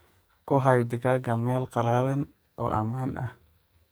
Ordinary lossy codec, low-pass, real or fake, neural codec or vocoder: none; none; fake; codec, 44.1 kHz, 2.6 kbps, SNAC